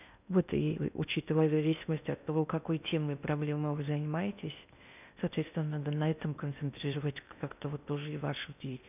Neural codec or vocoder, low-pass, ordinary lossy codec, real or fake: codec, 16 kHz in and 24 kHz out, 0.6 kbps, FocalCodec, streaming, 2048 codes; 3.6 kHz; none; fake